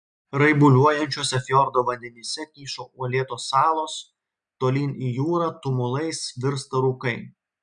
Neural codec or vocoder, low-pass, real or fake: none; 9.9 kHz; real